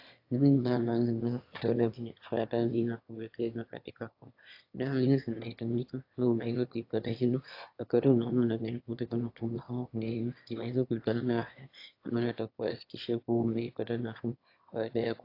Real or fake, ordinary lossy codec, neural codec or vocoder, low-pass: fake; AAC, 32 kbps; autoencoder, 22.05 kHz, a latent of 192 numbers a frame, VITS, trained on one speaker; 5.4 kHz